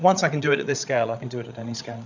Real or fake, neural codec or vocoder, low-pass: fake; codec, 16 kHz, 16 kbps, FreqCodec, larger model; 7.2 kHz